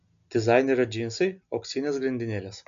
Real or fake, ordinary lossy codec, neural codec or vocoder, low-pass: real; MP3, 64 kbps; none; 7.2 kHz